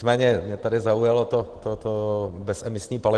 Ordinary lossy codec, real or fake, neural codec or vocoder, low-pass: Opus, 16 kbps; real; none; 9.9 kHz